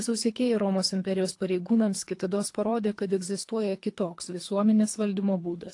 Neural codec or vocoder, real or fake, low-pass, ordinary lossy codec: codec, 24 kHz, 3 kbps, HILCodec; fake; 10.8 kHz; AAC, 48 kbps